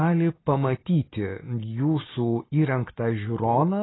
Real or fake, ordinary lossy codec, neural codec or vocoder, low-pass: real; AAC, 16 kbps; none; 7.2 kHz